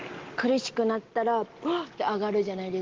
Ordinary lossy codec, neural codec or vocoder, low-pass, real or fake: Opus, 16 kbps; none; 7.2 kHz; real